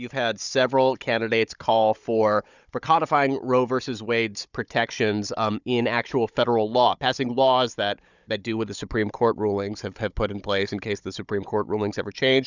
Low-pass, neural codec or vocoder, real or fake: 7.2 kHz; codec, 16 kHz, 16 kbps, FunCodec, trained on Chinese and English, 50 frames a second; fake